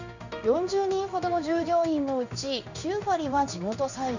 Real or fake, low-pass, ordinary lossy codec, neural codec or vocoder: fake; 7.2 kHz; none; codec, 16 kHz in and 24 kHz out, 1 kbps, XY-Tokenizer